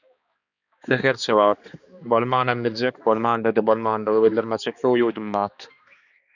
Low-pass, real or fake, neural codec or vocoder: 7.2 kHz; fake; codec, 16 kHz, 2 kbps, X-Codec, HuBERT features, trained on balanced general audio